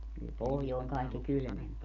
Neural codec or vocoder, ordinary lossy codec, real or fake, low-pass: none; none; real; 7.2 kHz